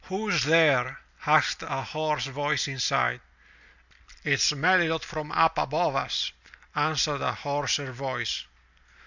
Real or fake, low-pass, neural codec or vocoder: real; 7.2 kHz; none